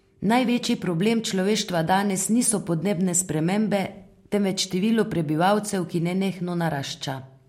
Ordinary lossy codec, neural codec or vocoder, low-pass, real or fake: MP3, 64 kbps; none; 19.8 kHz; real